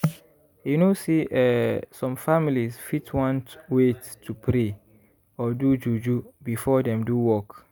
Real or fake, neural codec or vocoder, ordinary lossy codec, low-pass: real; none; none; none